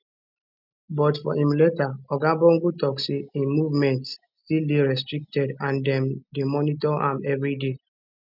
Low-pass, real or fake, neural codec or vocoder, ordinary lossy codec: 5.4 kHz; real; none; none